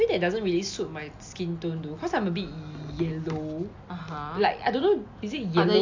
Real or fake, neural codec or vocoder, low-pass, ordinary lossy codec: real; none; 7.2 kHz; AAC, 48 kbps